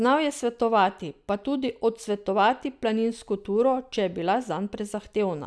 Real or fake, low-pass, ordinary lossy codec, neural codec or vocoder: real; none; none; none